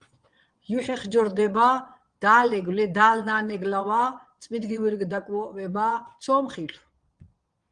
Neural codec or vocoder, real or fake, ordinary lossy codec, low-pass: vocoder, 22.05 kHz, 80 mel bands, Vocos; fake; Opus, 24 kbps; 9.9 kHz